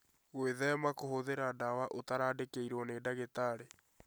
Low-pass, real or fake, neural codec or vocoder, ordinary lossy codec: none; real; none; none